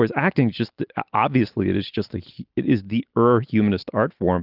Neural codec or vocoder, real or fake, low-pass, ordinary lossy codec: none; real; 5.4 kHz; Opus, 32 kbps